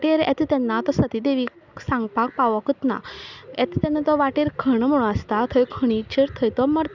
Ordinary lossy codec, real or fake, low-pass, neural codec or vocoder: none; real; 7.2 kHz; none